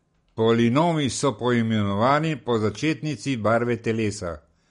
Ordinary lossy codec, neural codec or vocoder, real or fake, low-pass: MP3, 48 kbps; none; real; 10.8 kHz